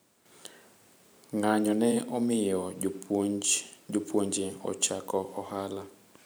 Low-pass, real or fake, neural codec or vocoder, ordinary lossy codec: none; fake; vocoder, 44.1 kHz, 128 mel bands every 512 samples, BigVGAN v2; none